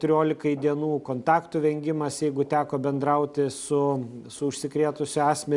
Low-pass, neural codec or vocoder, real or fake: 10.8 kHz; none; real